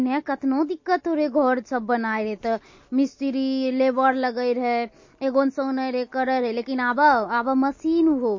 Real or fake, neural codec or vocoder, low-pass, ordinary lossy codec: real; none; 7.2 kHz; MP3, 32 kbps